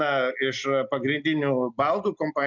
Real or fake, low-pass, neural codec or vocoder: real; 7.2 kHz; none